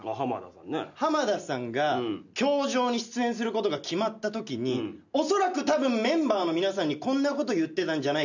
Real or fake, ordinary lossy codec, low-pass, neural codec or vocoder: real; none; 7.2 kHz; none